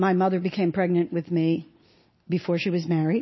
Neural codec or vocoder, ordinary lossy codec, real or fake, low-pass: none; MP3, 24 kbps; real; 7.2 kHz